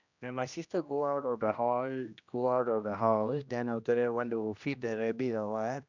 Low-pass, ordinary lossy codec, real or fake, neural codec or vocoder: 7.2 kHz; none; fake; codec, 16 kHz, 1 kbps, X-Codec, HuBERT features, trained on general audio